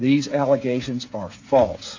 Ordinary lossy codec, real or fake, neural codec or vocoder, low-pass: AAC, 48 kbps; fake; codec, 16 kHz, 8 kbps, FreqCodec, smaller model; 7.2 kHz